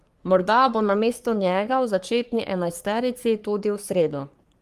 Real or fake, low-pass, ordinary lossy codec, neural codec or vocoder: fake; 14.4 kHz; Opus, 32 kbps; codec, 44.1 kHz, 3.4 kbps, Pupu-Codec